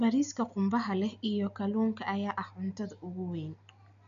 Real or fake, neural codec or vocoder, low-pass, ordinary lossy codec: real; none; 7.2 kHz; none